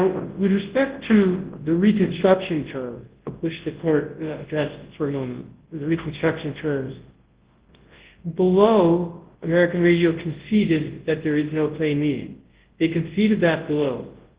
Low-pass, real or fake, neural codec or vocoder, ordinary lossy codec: 3.6 kHz; fake; codec, 24 kHz, 0.9 kbps, WavTokenizer, large speech release; Opus, 16 kbps